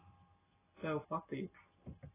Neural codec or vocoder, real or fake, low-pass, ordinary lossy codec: none; real; 3.6 kHz; AAC, 16 kbps